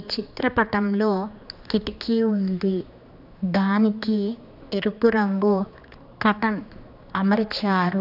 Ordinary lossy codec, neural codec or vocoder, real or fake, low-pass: AAC, 48 kbps; codec, 16 kHz, 2 kbps, X-Codec, HuBERT features, trained on general audio; fake; 5.4 kHz